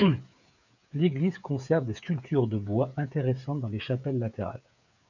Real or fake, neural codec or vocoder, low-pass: fake; codec, 16 kHz, 8 kbps, FreqCodec, smaller model; 7.2 kHz